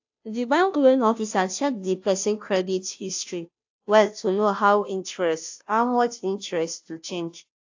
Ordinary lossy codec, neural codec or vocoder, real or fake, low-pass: AAC, 48 kbps; codec, 16 kHz, 0.5 kbps, FunCodec, trained on Chinese and English, 25 frames a second; fake; 7.2 kHz